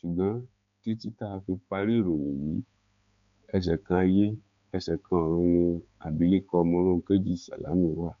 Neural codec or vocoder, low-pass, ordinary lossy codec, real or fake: codec, 16 kHz, 4 kbps, X-Codec, HuBERT features, trained on balanced general audio; 7.2 kHz; MP3, 64 kbps; fake